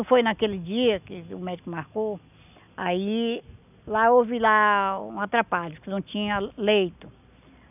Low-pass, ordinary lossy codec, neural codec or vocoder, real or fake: 3.6 kHz; none; none; real